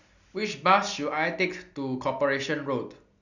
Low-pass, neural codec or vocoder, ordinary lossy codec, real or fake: 7.2 kHz; none; none; real